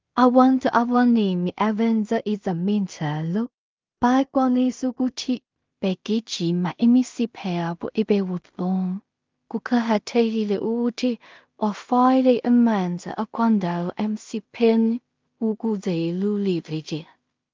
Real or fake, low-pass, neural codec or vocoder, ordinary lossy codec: fake; 7.2 kHz; codec, 16 kHz in and 24 kHz out, 0.4 kbps, LongCat-Audio-Codec, two codebook decoder; Opus, 32 kbps